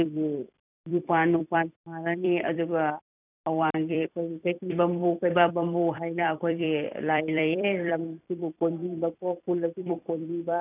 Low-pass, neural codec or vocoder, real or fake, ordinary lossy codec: 3.6 kHz; vocoder, 44.1 kHz, 128 mel bands every 256 samples, BigVGAN v2; fake; none